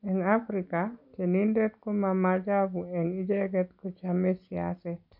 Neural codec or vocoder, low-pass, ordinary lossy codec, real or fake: none; 5.4 kHz; none; real